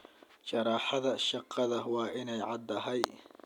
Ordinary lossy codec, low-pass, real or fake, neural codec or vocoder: none; 19.8 kHz; real; none